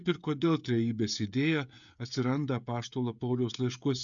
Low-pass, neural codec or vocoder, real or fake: 7.2 kHz; codec, 16 kHz, 16 kbps, FreqCodec, smaller model; fake